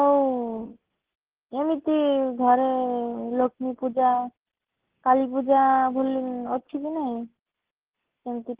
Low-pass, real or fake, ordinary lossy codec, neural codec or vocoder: 3.6 kHz; real; Opus, 16 kbps; none